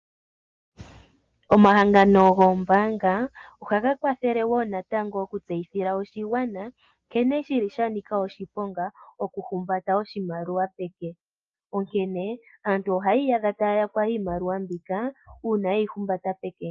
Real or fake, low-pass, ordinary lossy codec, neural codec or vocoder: real; 7.2 kHz; Opus, 24 kbps; none